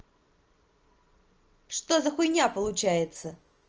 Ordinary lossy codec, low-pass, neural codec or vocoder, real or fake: Opus, 24 kbps; 7.2 kHz; none; real